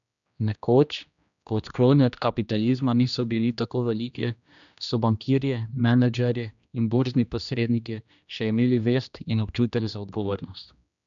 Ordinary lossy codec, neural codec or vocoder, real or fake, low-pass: none; codec, 16 kHz, 1 kbps, X-Codec, HuBERT features, trained on general audio; fake; 7.2 kHz